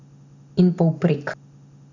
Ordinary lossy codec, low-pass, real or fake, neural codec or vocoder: none; 7.2 kHz; real; none